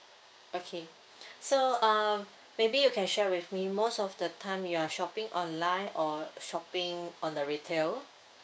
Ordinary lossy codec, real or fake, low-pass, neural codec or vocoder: none; fake; none; codec, 16 kHz, 6 kbps, DAC